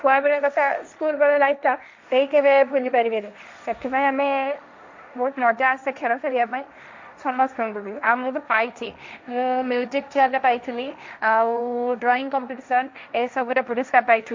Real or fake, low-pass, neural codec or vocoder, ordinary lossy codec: fake; 7.2 kHz; codec, 16 kHz, 1.1 kbps, Voila-Tokenizer; MP3, 64 kbps